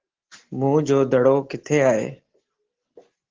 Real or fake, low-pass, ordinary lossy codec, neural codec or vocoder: real; 7.2 kHz; Opus, 16 kbps; none